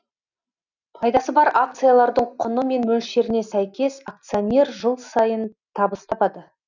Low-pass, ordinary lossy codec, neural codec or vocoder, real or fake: 7.2 kHz; none; none; real